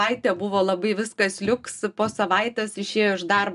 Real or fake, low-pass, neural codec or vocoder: real; 10.8 kHz; none